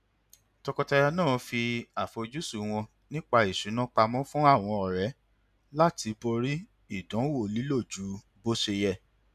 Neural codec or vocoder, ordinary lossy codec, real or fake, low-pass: none; none; real; 14.4 kHz